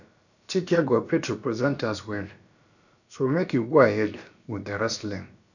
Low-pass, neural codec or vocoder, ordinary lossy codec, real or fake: 7.2 kHz; codec, 16 kHz, about 1 kbps, DyCAST, with the encoder's durations; none; fake